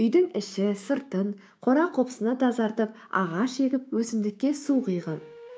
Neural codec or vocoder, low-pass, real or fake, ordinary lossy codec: codec, 16 kHz, 6 kbps, DAC; none; fake; none